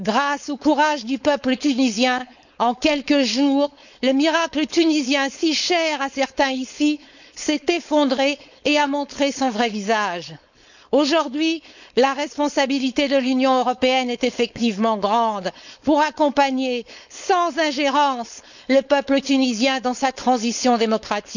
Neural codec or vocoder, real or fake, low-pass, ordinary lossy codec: codec, 16 kHz, 4.8 kbps, FACodec; fake; 7.2 kHz; none